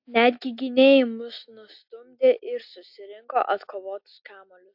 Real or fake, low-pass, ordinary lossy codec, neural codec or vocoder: real; 5.4 kHz; AAC, 48 kbps; none